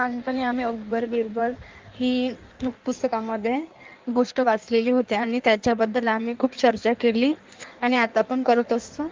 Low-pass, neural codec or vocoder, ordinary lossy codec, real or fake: 7.2 kHz; codec, 16 kHz in and 24 kHz out, 1.1 kbps, FireRedTTS-2 codec; Opus, 24 kbps; fake